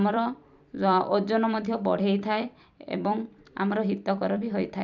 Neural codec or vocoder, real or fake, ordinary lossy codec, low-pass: vocoder, 44.1 kHz, 128 mel bands every 256 samples, BigVGAN v2; fake; none; 7.2 kHz